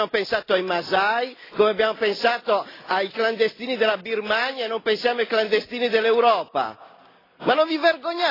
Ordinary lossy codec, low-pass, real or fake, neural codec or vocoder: AAC, 24 kbps; 5.4 kHz; real; none